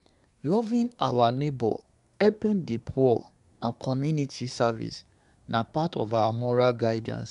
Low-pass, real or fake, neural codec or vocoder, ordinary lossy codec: 10.8 kHz; fake; codec, 24 kHz, 1 kbps, SNAC; none